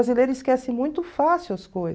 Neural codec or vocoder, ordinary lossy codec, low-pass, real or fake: none; none; none; real